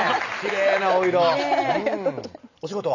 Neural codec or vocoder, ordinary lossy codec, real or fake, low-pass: none; none; real; 7.2 kHz